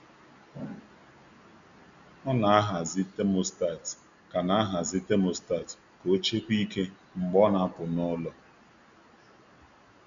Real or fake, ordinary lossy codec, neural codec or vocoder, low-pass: real; none; none; 7.2 kHz